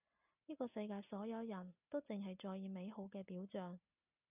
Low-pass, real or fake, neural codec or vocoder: 3.6 kHz; real; none